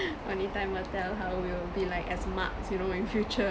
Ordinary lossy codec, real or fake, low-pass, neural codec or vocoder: none; real; none; none